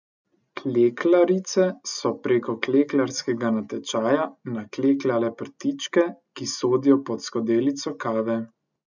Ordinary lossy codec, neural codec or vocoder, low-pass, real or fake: none; none; 7.2 kHz; real